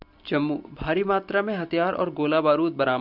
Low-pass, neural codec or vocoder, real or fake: 5.4 kHz; none; real